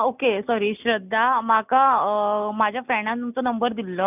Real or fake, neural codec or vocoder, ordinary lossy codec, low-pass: real; none; none; 3.6 kHz